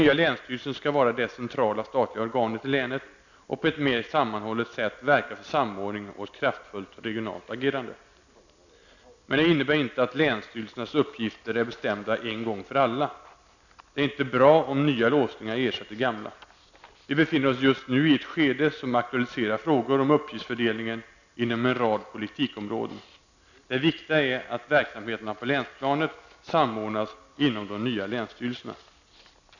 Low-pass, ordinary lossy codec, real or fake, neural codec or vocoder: 7.2 kHz; none; real; none